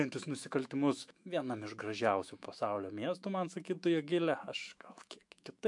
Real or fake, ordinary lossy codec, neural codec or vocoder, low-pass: fake; MP3, 64 kbps; autoencoder, 48 kHz, 128 numbers a frame, DAC-VAE, trained on Japanese speech; 10.8 kHz